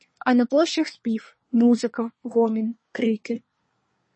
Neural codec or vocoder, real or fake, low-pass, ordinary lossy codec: codec, 24 kHz, 1 kbps, SNAC; fake; 9.9 kHz; MP3, 32 kbps